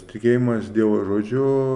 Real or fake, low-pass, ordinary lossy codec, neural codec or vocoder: real; 10.8 kHz; Opus, 64 kbps; none